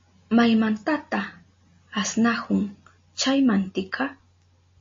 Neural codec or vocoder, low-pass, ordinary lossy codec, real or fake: none; 7.2 kHz; MP3, 32 kbps; real